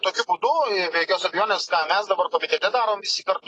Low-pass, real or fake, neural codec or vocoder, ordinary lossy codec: 10.8 kHz; real; none; AAC, 32 kbps